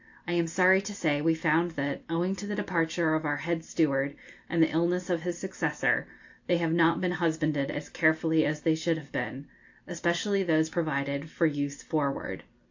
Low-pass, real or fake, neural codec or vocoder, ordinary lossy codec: 7.2 kHz; real; none; AAC, 48 kbps